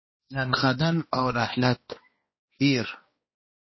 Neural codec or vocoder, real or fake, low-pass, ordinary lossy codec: codec, 16 kHz, 1 kbps, X-Codec, HuBERT features, trained on balanced general audio; fake; 7.2 kHz; MP3, 24 kbps